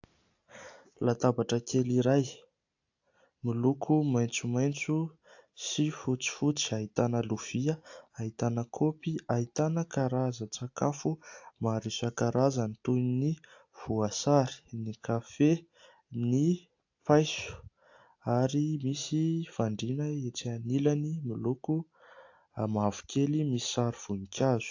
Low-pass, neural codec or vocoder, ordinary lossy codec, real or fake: 7.2 kHz; none; AAC, 48 kbps; real